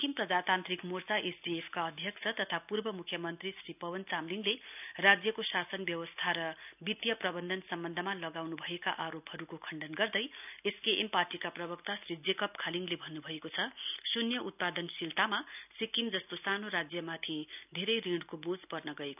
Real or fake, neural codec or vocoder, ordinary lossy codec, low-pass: real; none; none; 3.6 kHz